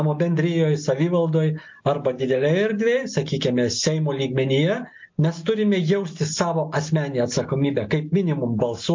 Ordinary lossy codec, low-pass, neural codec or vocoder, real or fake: MP3, 48 kbps; 7.2 kHz; none; real